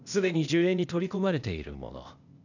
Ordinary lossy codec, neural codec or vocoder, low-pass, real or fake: none; codec, 16 kHz, 0.8 kbps, ZipCodec; 7.2 kHz; fake